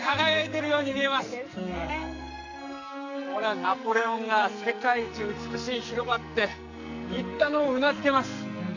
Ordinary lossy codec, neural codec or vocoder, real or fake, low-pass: none; codec, 44.1 kHz, 2.6 kbps, SNAC; fake; 7.2 kHz